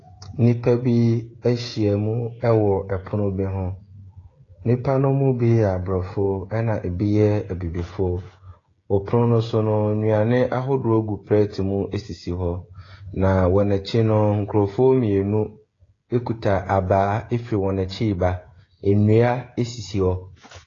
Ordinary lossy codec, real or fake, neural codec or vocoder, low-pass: AAC, 32 kbps; fake; codec, 16 kHz, 16 kbps, FreqCodec, smaller model; 7.2 kHz